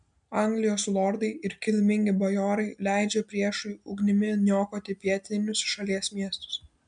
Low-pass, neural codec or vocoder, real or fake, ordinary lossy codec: 9.9 kHz; none; real; MP3, 96 kbps